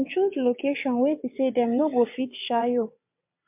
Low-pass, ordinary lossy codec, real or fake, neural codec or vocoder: 3.6 kHz; MP3, 32 kbps; fake; vocoder, 22.05 kHz, 80 mel bands, WaveNeXt